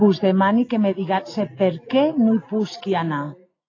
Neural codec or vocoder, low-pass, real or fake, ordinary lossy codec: none; 7.2 kHz; real; AAC, 32 kbps